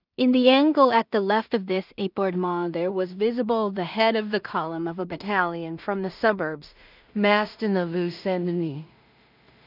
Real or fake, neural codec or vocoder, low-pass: fake; codec, 16 kHz in and 24 kHz out, 0.4 kbps, LongCat-Audio-Codec, two codebook decoder; 5.4 kHz